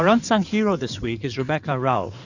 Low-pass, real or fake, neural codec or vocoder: 7.2 kHz; fake; codec, 16 kHz in and 24 kHz out, 2.2 kbps, FireRedTTS-2 codec